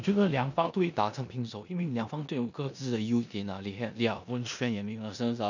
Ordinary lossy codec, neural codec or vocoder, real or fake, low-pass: none; codec, 16 kHz in and 24 kHz out, 0.9 kbps, LongCat-Audio-Codec, four codebook decoder; fake; 7.2 kHz